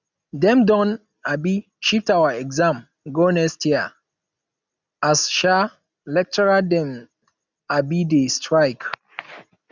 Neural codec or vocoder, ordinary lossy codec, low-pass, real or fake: none; none; 7.2 kHz; real